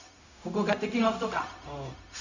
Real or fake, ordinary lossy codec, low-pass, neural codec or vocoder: fake; none; 7.2 kHz; codec, 16 kHz, 0.4 kbps, LongCat-Audio-Codec